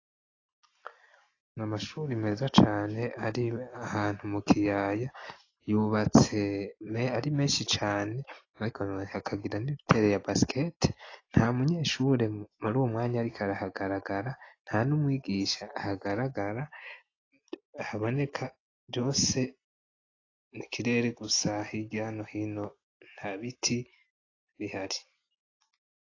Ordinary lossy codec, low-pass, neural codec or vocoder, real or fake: AAC, 32 kbps; 7.2 kHz; none; real